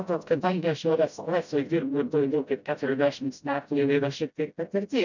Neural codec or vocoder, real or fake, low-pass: codec, 16 kHz, 0.5 kbps, FreqCodec, smaller model; fake; 7.2 kHz